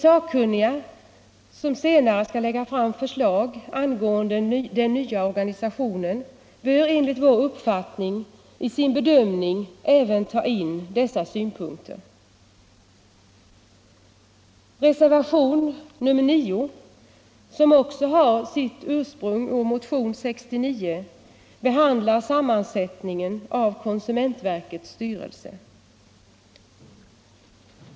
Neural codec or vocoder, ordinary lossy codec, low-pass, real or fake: none; none; none; real